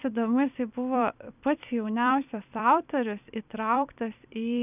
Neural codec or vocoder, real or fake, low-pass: vocoder, 44.1 kHz, 128 mel bands every 256 samples, BigVGAN v2; fake; 3.6 kHz